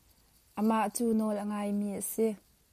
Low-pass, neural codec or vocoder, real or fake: 14.4 kHz; none; real